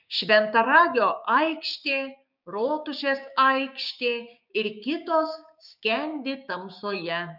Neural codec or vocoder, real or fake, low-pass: codec, 16 kHz, 6 kbps, DAC; fake; 5.4 kHz